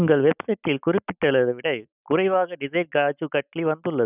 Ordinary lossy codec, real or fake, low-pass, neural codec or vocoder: none; real; 3.6 kHz; none